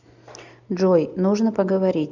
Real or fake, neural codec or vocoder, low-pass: real; none; 7.2 kHz